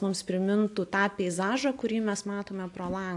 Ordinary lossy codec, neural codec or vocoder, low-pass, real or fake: AAC, 64 kbps; none; 10.8 kHz; real